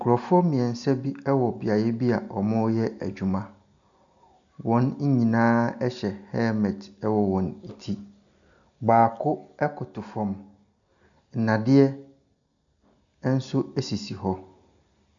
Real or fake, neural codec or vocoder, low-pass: real; none; 7.2 kHz